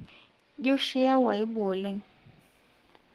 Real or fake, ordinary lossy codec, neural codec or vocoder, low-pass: fake; Opus, 24 kbps; codec, 32 kHz, 1.9 kbps, SNAC; 14.4 kHz